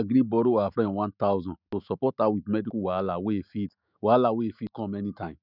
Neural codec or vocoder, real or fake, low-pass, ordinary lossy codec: autoencoder, 48 kHz, 128 numbers a frame, DAC-VAE, trained on Japanese speech; fake; 5.4 kHz; none